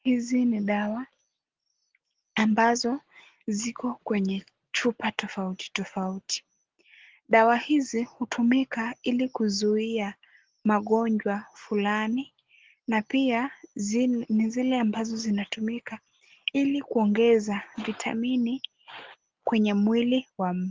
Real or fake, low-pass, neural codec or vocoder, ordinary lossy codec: real; 7.2 kHz; none; Opus, 16 kbps